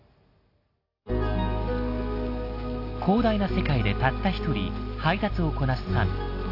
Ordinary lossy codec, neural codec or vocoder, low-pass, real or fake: AAC, 32 kbps; none; 5.4 kHz; real